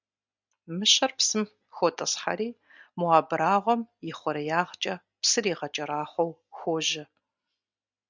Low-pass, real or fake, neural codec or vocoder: 7.2 kHz; real; none